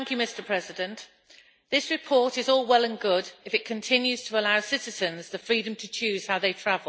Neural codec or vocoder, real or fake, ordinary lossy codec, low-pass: none; real; none; none